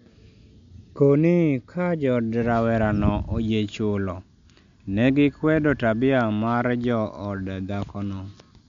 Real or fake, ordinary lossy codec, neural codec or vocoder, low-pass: real; MP3, 64 kbps; none; 7.2 kHz